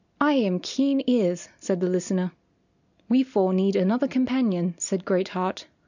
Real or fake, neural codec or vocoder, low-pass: real; none; 7.2 kHz